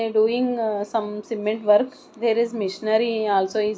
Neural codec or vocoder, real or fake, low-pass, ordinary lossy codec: none; real; none; none